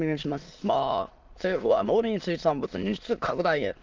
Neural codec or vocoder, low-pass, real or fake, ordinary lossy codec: autoencoder, 22.05 kHz, a latent of 192 numbers a frame, VITS, trained on many speakers; 7.2 kHz; fake; Opus, 32 kbps